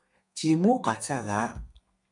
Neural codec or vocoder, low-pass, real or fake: codec, 32 kHz, 1.9 kbps, SNAC; 10.8 kHz; fake